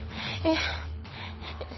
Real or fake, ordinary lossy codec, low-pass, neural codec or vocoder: fake; MP3, 24 kbps; 7.2 kHz; codec, 24 kHz, 6 kbps, HILCodec